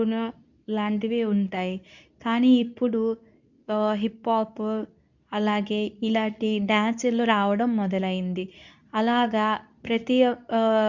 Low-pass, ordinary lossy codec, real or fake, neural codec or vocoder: 7.2 kHz; none; fake; codec, 24 kHz, 0.9 kbps, WavTokenizer, medium speech release version 2